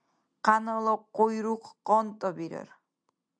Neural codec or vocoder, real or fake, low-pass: none; real; 9.9 kHz